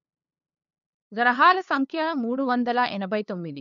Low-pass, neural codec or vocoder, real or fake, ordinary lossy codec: 7.2 kHz; codec, 16 kHz, 2 kbps, FunCodec, trained on LibriTTS, 25 frames a second; fake; none